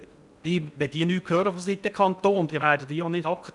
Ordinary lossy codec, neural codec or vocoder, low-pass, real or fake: none; codec, 16 kHz in and 24 kHz out, 0.8 kbps, FocalCodec, streaming, 65536 codes; 10.8 kHz; fake